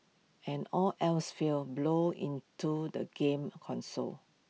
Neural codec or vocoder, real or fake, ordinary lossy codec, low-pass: none; real; none; none